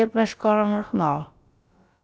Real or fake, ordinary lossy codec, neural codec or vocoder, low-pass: fake; none; codec, 16 kHz, about 1 kbps, DyCAST, with the encoder's durations; none